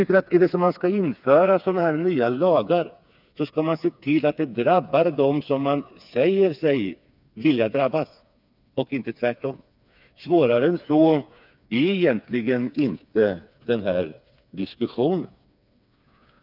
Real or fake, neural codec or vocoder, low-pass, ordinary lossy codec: fake; codec, 16 kHz, 4 kbps, FreqCodec, smaller model; 5.4 kHz; none